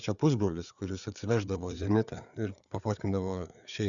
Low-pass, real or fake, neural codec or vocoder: 7.2 kHz; fake; codec, 16 kHz, 4 kbps, FreqCodec, larger model